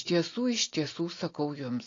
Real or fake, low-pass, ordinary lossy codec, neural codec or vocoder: real; 7.2 kHz; AAC, 32 kbps; none